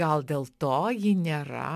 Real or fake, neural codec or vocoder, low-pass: real; none; 14.4 kHz